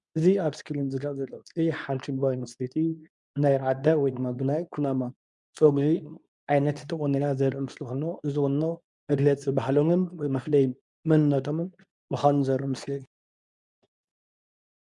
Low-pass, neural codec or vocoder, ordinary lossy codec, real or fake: 10.8 kHz; codec, 24 kHz, 0.9 kbps, WavTokenizer, medium speech release version 2; MP3, 96 kbps; fake